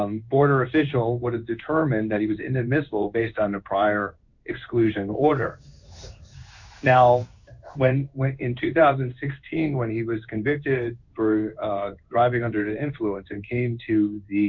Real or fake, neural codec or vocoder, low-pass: fake; codec, 16 kHz in and 24 kHz out, 1 kbps, XY-Tokenizer; 7.2 kHz